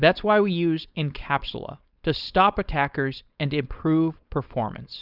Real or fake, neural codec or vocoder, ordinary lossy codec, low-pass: fake; codec, 16 kHz, 4.8 kbps, FACodec; Opus, 64 kbps; 5.4 kHz